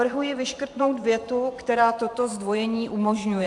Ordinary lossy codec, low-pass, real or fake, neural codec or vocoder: AAC, 64 kbps; 10.8 kHz; fake; vocoder, 44.1 kHz, 128 mel bands every 512 samples, BigVGAN v2